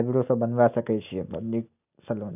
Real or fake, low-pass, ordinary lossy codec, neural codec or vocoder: real; 3.6 kHz; none; none